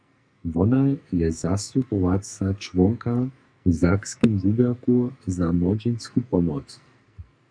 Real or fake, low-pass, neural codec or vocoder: fake; 9.9 kHz; codec, 44.1 kHz, 2.6 kbps, SNAC